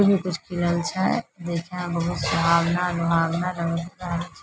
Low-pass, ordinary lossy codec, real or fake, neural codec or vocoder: none; none; real; none